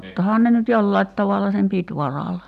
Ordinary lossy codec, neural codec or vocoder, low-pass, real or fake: none; none; 14.4 kHz; real